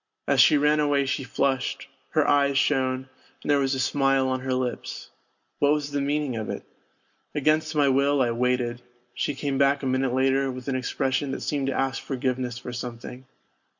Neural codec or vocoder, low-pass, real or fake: none; 7.2 kHz; real